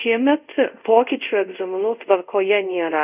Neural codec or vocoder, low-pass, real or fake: codec, 24 kHz, 0.5 kbps, DualCodec; 3.6 kHz; fake